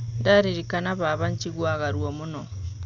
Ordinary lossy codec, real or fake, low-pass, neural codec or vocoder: none; real; 7.2 kHz; none